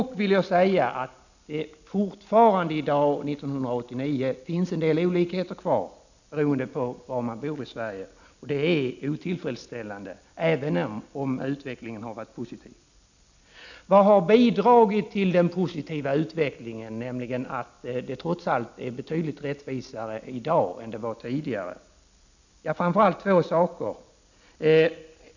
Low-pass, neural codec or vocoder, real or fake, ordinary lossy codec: 7.2 kHz; none; real; none